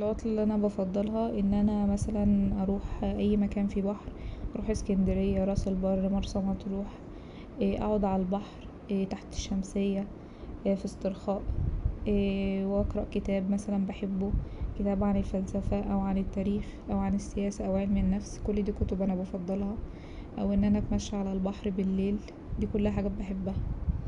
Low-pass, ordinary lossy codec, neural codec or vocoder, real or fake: 10.8 kHz; none; none; real